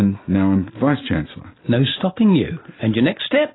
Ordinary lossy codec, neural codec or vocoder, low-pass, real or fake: AAC, 16 kbps; none; 7.2 kHz; real